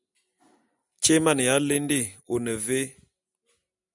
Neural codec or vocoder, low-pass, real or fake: none; 10.8 kHz; real